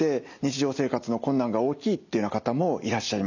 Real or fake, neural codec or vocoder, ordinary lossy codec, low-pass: real; none; none; 7.2 kHz